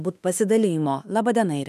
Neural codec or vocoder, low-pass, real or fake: autoencoder, 48 kHz, 32 numbers a frame, DAC-VAE, trained on Japanese speech; 14.4 kHz; fake